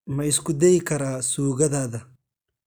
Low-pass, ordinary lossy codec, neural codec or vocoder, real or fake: none; none; none; real